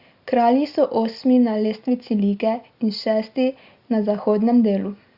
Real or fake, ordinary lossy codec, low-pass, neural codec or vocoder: real; Opus, 64 kbps; 5.4 kHz; none